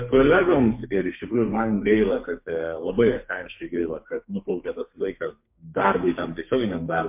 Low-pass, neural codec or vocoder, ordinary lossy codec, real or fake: 3.6 kHz; codec, 32 kHz, 1.9 kbps, SNAC; MP3, 32 kbps; fake